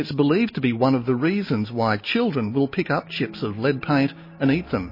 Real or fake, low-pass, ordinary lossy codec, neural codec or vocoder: real; 5.4 kHz; MP3, 24 kbps; none